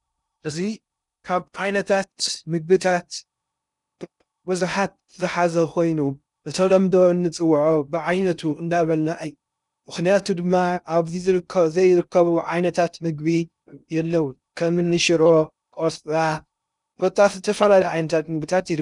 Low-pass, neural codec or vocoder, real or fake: 10.8 kHz; codec, 16 kHz in and 24 kHz out, 0.6 kbps, FocalCodec, streaming, 2048 codes; fake